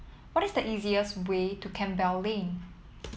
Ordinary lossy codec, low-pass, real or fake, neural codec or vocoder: none; none; real; none